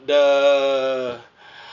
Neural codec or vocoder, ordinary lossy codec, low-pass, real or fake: none; none; 7.2 kHz; real